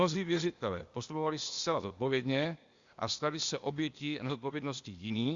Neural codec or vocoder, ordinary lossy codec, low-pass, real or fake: codec, 16 kHz, 0.8 kbps, ZipCodec; Opus, 64 kbps; 7.2 kHz; fake